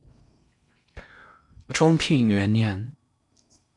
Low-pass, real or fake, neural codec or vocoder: 10.8 kHz; fake; codec, 16 kHz in and 24 kHz out, 0.6 kbps, FocalCodec, streaming, 4096 codes